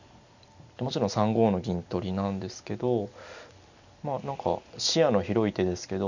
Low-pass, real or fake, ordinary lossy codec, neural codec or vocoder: 7.2 kHz; real; none; none